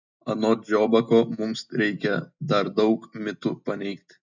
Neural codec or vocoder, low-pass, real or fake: none; 7.2 kHz; real